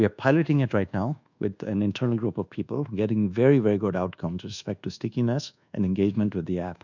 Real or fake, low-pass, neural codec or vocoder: fake; 7.2 kHz; codec, 24 kHz, 1.2 kbps, DualCodec